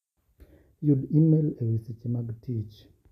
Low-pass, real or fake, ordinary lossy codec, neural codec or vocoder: 14.4 kHz; real; none; none